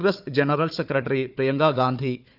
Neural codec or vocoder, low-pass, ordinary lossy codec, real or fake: codec, 16 kHz, 4 kbps, FunCodec, trained on Chinese and English, 50 frames a second; 5.4 kHz; none; fake